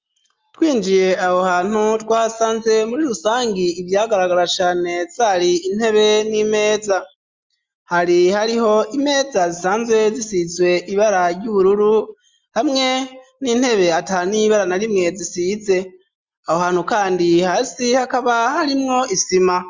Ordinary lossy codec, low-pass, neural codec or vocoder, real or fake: Opus, 32 kbps; 7.2 kHz; none; real